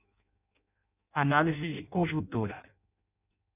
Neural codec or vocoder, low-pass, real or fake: codec, 16 kHz in and 24 kHz out, 0.6 kbps, FireRedTTS-2 codec; 3.6 kHz; fake